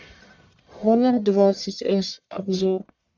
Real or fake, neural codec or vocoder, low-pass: fake; codec, 44.1 kHz, 1.7 kbps, Pupu-Codec; 7.2 kHz